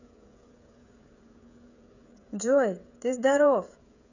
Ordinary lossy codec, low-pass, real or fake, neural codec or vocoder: none; 7.2 kHz; fake; codec, 16 kHz, 16 kbps, FreqCodec, smaller model